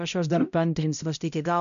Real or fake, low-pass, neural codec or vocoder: fake; 7.2 kHz; codec, 16 kHz, 0.5 kbps, X-Codec, HuBERT features, trained on balanced general audio